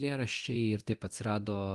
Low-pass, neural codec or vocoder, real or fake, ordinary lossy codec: 10.8 kHz; codec, 24 kHz, 0.9 kbps, DualCodec; fake; Opus, 24 kbps